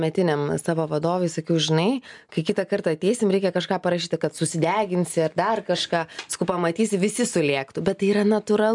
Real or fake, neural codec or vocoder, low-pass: real; none; 10.8 kHz